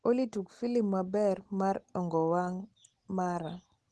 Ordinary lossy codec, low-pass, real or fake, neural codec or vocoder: Opus, 16 kbps; 10.8 kHz; real; none